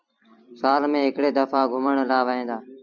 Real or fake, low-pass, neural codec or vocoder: fake; 7.2 kHz; vocoder, 44.1 kHz, 128 mel bands every 256 samples, BigVGAN v2